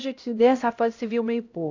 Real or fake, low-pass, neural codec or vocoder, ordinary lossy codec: fake; 7.2 kHz; codec, 16 kHz, 0.5 kbps, X-Codec, HuBERT features, trained on LibriSpeech; AAC, 48 kbps